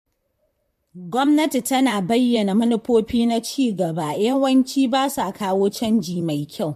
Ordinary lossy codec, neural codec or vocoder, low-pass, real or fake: MP3, 64 kbps; vocoder, 44.1 kHz, 128 mel bands, Pupu-Vocoder; 14.4 kHz; fake